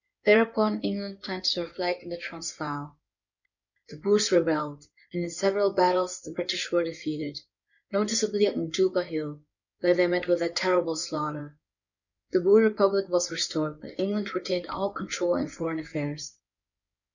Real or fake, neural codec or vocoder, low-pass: fake; codec, 16 kHz in and 24 kHz out, 2.2 kbps, FireRedTTS-2 codec; 7.2 kHz